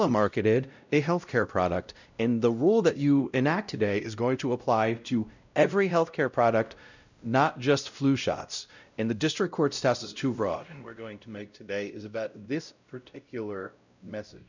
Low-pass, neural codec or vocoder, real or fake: 7.2 kHz; codec, 16 kHz, 0.5 kbps, X-Codec, WavLM features, trained on Multilingual LibriSpeech; fake